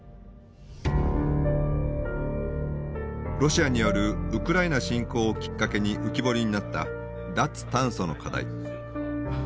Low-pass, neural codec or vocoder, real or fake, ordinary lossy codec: none; none; real; none